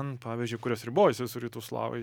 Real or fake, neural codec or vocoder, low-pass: real; none; 19.8 kHz